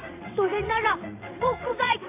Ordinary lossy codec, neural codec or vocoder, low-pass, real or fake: AAC, 24 kbps; vocoder, 22.05 kHz, 80 mel bands, WaveNeXt; 3.6 kHz; fake